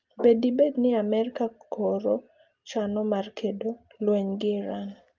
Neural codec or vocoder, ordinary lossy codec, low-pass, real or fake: none; Opus, 24 kbps; 7.2 kHz; real